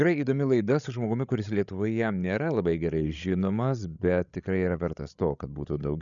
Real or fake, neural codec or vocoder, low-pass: fake; codec, 16 kHz, 16 kbps, FreqCodec, larger model; 7.2 kHz